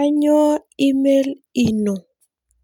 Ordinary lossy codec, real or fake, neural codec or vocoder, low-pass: none; real; none; 19.8 kHz